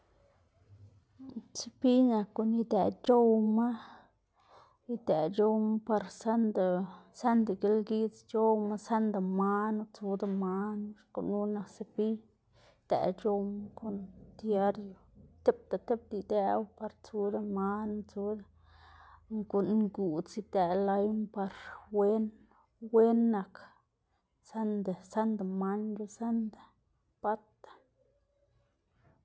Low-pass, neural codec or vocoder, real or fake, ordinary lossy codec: none; none; real; none